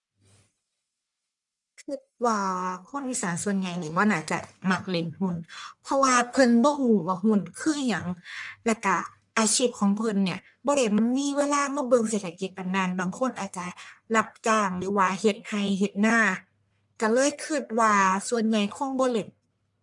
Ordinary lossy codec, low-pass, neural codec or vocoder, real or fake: none; 10.8 kHz; codec, 44.1 kHz, 1.7 kbps, Pupu-Codec; fake